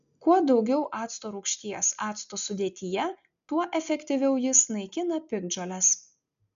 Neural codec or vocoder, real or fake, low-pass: none; real; 7.2 kHz